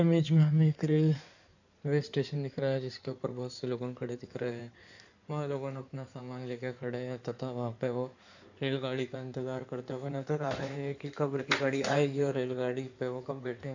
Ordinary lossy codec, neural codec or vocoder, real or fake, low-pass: none; codec, 16 kHz in and 24 kHz out, 2.2 kbps, FireRedTTS-2 codec; fake; 7.2 kHz